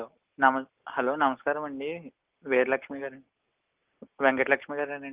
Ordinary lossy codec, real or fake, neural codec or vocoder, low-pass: Opus, 32 kbps; real; none; 3.6 kHz